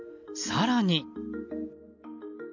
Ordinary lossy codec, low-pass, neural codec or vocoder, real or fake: none; 7.2 kHz; none; real